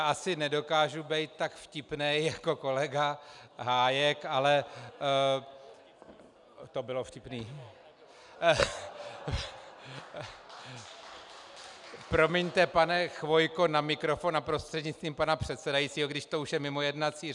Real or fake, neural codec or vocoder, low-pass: real; none; 10.8 kHz